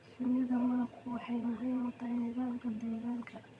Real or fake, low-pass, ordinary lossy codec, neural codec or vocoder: fake; none; none; vocoder, 22.05 kHz, 80 mel bands, HiFi-GAN